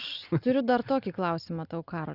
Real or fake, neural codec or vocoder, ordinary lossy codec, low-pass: real; none; Opus, 64 kbps; 5.4 kHz